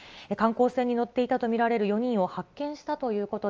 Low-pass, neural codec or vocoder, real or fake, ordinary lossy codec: 7.2 kHz; none; real; Opus, 24 kbps